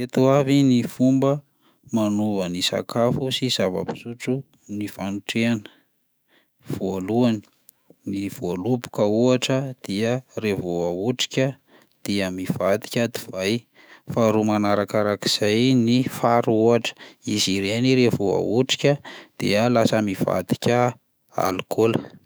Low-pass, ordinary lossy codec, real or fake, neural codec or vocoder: none; none; fake; autoencoder, 48 kHz, 128 numbers a frame, DAC-VAE, trained on Japanese speech